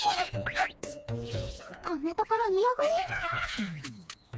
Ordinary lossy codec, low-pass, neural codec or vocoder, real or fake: none; none; codec, 16 kHz, 2 kbps, FreqCodec, smaller model; fake